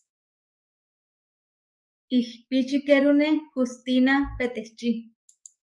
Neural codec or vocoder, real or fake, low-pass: codec, 44.1 kHz, 7.8 kbps, DAC; fake; 10.8 kHz